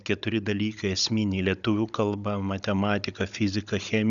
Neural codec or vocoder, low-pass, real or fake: codec, 16 kHz, 16 kbps, FunCodec, trained on Chinese and English, 50 frames a second; 7.2 kHz; fake